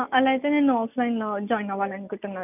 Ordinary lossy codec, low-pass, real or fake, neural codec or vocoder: none; 3.6 kHz; real; none